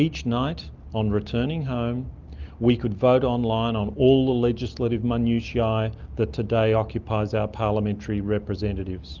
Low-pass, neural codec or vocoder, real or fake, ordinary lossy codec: 7.2 kHz; none; real; Opus, 24 kbps